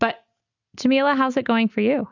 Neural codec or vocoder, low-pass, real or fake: none; 7.2 kHz; real